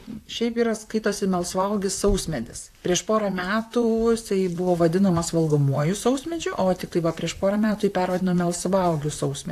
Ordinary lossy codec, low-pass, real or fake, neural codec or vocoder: AAC, 64 kbps; 14.4 kHz; fake; vocoder, 44.1 kHz, 128 mel bands, Pupu-Vocoder